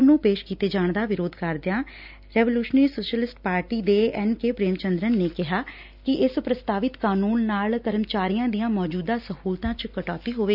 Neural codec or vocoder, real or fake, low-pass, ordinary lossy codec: none; real; 5.4 kHz; none